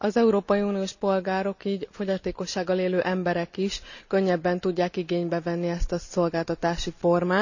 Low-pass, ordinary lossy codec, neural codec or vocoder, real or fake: 7.2 kHz; none; none; real